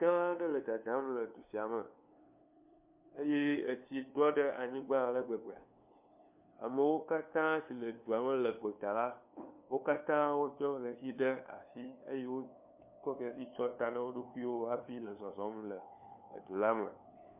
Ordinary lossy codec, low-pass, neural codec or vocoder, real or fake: MP3, 32 kbps; 3.6 kHz; codec, 16 kHz, 2 kbps, FunCodec, trained on LibriTTS, 25 frames a second; fake